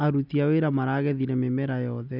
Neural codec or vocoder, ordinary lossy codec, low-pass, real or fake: none; none; 5.4 kHz; real